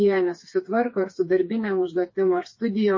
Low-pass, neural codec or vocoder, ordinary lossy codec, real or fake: 7.2 kHz; codec, 16 kHz, 4 kbps, FreqCodec, smaller model; MP3, 32 kbps; fake